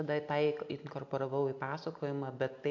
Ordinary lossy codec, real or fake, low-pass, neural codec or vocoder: AAC, 48 kbps; real; 7.2 kHz; none